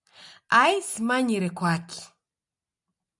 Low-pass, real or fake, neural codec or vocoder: 10.8 kHz; real; none